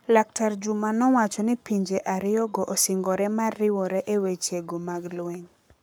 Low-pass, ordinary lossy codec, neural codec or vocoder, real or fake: none; none; codec, 44.1 kHz, 7.8 kbps, Pupu-Codec; fake